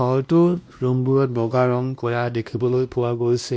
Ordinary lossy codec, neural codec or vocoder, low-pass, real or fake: none; codec, 16 kHz, 1 kbps, X-Codec, WavLM features, trained on Multilingual LibriSpeech; none; fake